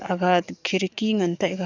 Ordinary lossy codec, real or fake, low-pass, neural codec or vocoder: none; fake; 7.2 kHz; codec, 44.1 kHz, 7.8 kbps, DAC